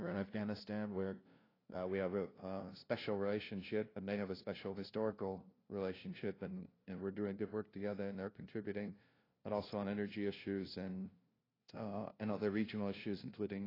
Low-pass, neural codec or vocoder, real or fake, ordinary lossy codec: 5.4 kHz; codec, 16 kHz, 0.5 kbps, FunCodec, trained on LibriTTS, 25 frames a second; fake; AAC, 24 kbps